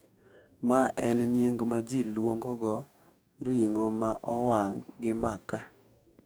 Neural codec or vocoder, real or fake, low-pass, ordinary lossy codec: codec, 44.1 kHz, 2.6 kbps, DAC; fake; none; none